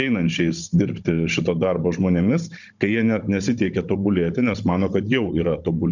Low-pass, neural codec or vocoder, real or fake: 7.2 kHz; codec, 16 kHz, 16 kbps, FunCodec, trained on Chinese and English, 50 frames a second; fake